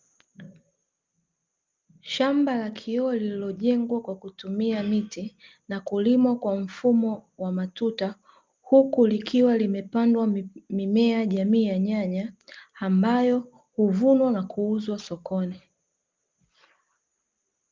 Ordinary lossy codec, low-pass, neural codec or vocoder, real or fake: Opus, 32 kbps; 7.2 kHz; none; real